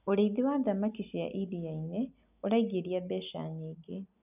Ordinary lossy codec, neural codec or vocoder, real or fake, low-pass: none; none; real; 3.6 kHz